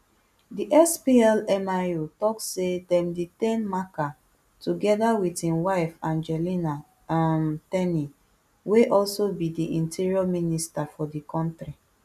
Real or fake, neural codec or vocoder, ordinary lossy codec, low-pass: real; none; none; 14.4 kHz